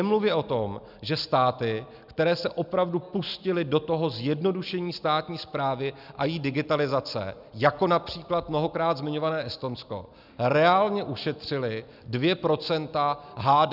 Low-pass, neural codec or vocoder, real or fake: 5.4 kHz; none; real